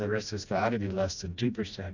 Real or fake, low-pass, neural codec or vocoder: fake; 7.2 kHz; codec, 16 kHz, 1 kbps, FreqCodec, smaller model